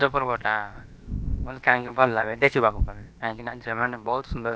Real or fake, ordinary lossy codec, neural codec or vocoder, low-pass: fake; none; codec, 16 kHz, about 1 kbps, DyCAST, with the encoder's durations; none